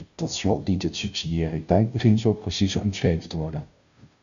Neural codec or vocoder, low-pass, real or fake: codec, 16 kHz, 0.5 kbps, FunCodec, trained on Chinese and English, 25 frames a second; 7.2 kHz; fake